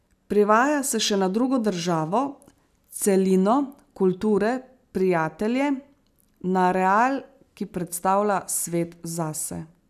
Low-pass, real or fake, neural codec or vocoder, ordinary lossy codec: 14.4 kHz; real; none; none